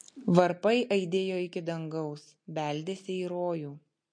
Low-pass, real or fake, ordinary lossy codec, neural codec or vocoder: 9.9 kHz; real; MP3, 48 kbps; none